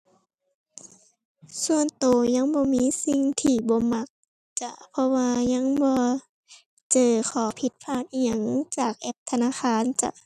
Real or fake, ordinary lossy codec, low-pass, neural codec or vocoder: real; none; 14.4 kHz; none